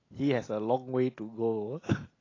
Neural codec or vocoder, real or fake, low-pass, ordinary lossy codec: none; real; 7.2 kHz; AAC, 32 kbps